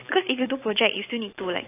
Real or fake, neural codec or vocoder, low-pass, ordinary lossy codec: real; none; 3.6 kHz; AAC, 24 kbps